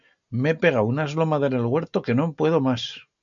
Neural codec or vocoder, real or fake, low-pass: none; real; 7.2 kHz